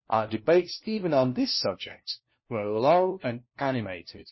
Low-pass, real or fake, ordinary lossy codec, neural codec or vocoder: 7.2 kHz; fake; MP3, 24 kbps; codec, 16 kHz, 1 kbps, FunCodec, trained on LibriTTS, 50 frames a second